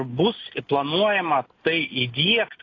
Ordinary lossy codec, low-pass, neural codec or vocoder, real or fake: AAC, 32 kbps; 7.2 kHz; none; real